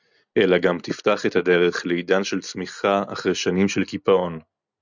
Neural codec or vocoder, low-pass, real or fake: none; 7.2 kHz; real